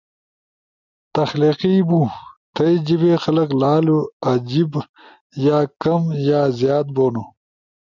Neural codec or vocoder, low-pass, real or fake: none; 7.2 kHz; real